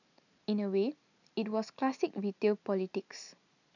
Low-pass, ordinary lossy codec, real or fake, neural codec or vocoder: 7.2 kHz; none; real; none